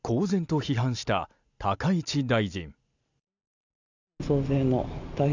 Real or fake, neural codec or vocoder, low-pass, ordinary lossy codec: real; none; 7.2 kHz; none